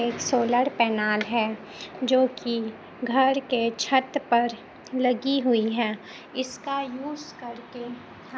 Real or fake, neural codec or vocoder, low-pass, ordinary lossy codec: real; none; none; none